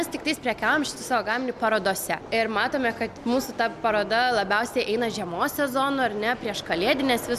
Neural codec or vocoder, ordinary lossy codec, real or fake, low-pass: none; MP3, 96 kbps; real; 14.4 kHz